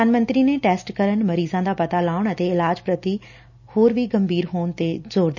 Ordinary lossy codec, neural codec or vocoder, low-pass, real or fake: none; none; 7.2 kHz; real